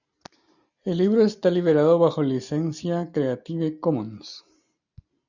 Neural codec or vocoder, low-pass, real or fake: none; 7.2 kHz; real